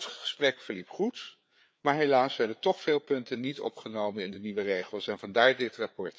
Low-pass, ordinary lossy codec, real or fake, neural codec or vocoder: none; none; fake; codec, 16 kHz, 4 kbps, FreqCodec, larger model